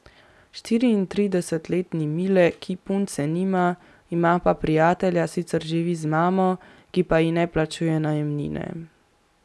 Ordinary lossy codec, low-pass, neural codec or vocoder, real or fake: none; none; none; real